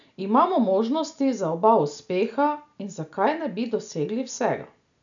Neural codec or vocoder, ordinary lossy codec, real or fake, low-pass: none; none; real; 7.2 kHz